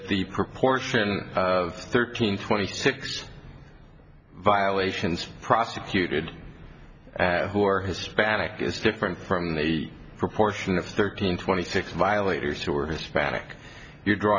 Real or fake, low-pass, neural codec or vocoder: real; 7.2 kHz; none